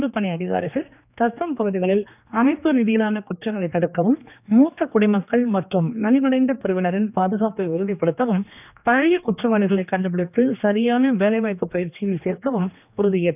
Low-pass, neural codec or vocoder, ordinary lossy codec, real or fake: 3.6 kHz; codec, 16 kHz, 2 kbps, X-Codec, HuBERT features, trained on general audio; none; fake